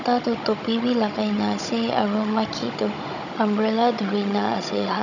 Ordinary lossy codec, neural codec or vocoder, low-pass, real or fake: none; codec, 16 kHz, 16 kbps, FunCodec, trained on Chinese and English, 50 frames a second; 7.2 kHz; fake